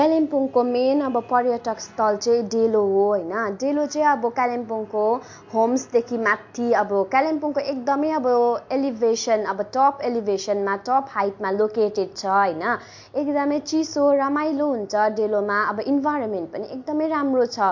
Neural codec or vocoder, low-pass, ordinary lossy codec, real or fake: none; 7.2 kHz; MP3, 48 kbps; real